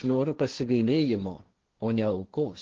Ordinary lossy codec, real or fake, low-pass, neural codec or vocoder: Opus, 16 kbps; fake; 7.2 kHz; codec, 16 kHz, 1.1 kbps, Voila-Tokenizer